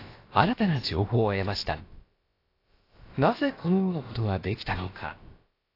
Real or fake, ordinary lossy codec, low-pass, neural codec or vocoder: fake; AAC, 32 kbps; 5.4 kHz; codec, 16 kHz, about 1 kbps, DyCAST, with the encoder's durations